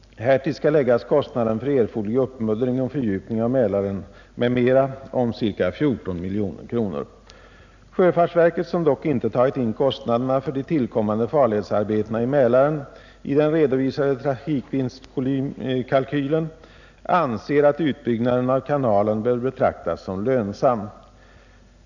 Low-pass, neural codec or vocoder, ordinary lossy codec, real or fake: 7.2 kHz; none; none; real